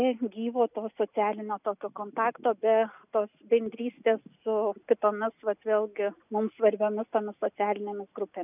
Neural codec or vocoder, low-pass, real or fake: none; 3.6 kHz; real